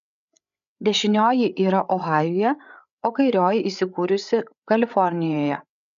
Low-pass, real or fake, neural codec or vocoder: 7.2 kHz; fake; codec, 16 kHz, 8 kbps, FreqCodec, larger model